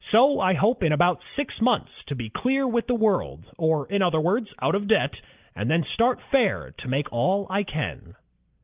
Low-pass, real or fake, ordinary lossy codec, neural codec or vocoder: 3.6 kHz; real; Opus, 24 kbps; none